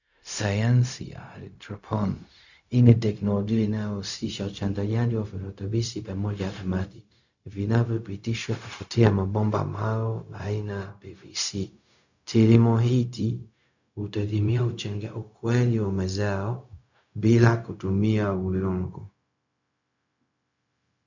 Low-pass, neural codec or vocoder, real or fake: 7.2 kHz; codec, 16 kHz, 0.4 kbps, LongCat-Audio-Codec; fake